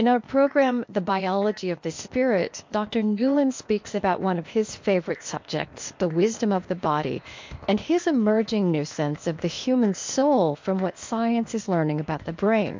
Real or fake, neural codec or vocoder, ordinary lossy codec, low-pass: fake; codec, 16 kHz, 0.8 kbps, ZipCodec; MP3, 48 kbps; 7.2 kHz